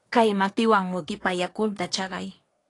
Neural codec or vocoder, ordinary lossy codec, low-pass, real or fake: codec, 24 kHz, 1 kbps, SNAC; AAC, 48 kbps; 10.8 kHz; fake